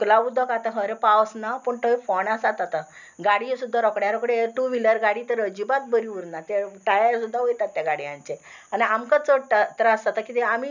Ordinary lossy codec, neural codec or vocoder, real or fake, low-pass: none; none; real; 7.2 kHz